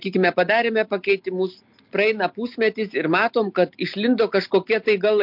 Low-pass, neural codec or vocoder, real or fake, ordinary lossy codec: 5.4 kHz; none; real; MP3, 48 kbps